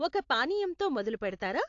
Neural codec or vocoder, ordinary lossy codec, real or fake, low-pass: none; AAC, 48 kbps; real; 7.2 kHz